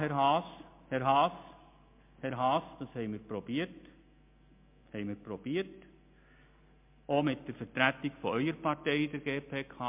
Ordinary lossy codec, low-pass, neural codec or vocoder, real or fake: MP3, 32 kbps; 3.6 kHz; none; real